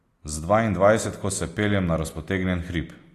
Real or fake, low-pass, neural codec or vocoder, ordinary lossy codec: real; 14.4 kHz; none; AAC, 48 kbps